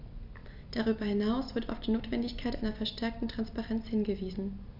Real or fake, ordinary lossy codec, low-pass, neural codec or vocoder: real; none; 5.4 kHz; none